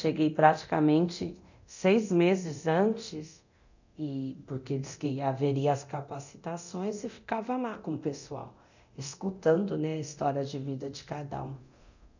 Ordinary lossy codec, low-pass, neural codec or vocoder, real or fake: none; 7.2 kHz; codec, 24 kHz, 0.9 kbps, DualCodec; fake